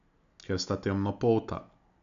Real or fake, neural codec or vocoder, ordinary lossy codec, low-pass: real; none; none; 7.2 kHz